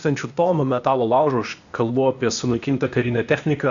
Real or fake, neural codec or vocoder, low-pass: fake; codec, 16 kHz, 0.8 kbps, ZipCodec; 7.2 kHz